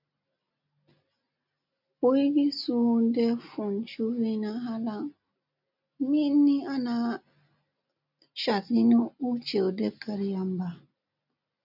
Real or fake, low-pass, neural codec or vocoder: real; 5.4 kHz; none